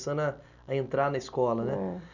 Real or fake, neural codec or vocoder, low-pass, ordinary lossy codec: real; none; 7.2 kHz; none